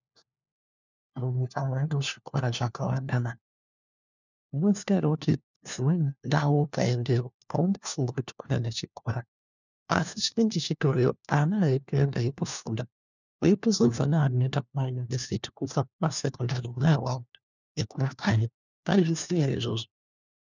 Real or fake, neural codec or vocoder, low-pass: fake; codec, 16 kHz, 1 kbps, FunCodec, trained on LibriTTS, 50 frames a second; 7.2 kHz